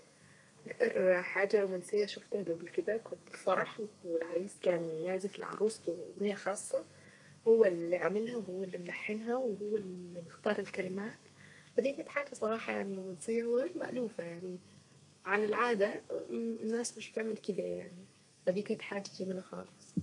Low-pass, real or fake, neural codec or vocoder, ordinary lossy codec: 10.8 kHz; fake; codec, 32 kHz, 1.9 kbps, SNAC; none